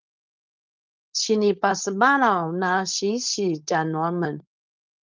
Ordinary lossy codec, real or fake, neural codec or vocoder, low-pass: Opus, 24 kbps; fake; codec, 16 kHz, 4.8 kbps, FACodec; 7.2 kHz